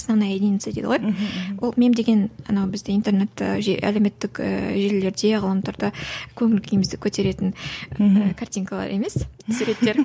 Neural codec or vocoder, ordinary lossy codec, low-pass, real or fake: none; none; none; real